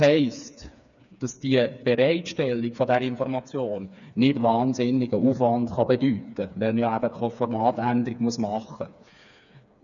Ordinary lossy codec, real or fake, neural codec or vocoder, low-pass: none; fake; codec, 16 kHz, 4 kbps, FreqCodec, smaller model; 7.2 kHz